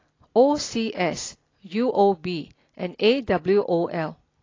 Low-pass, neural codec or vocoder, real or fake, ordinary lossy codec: 7.2 kHz; none; real; AAC, 32 kbps